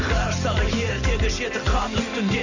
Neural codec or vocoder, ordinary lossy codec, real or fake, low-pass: none; none; real; 7.2 kHz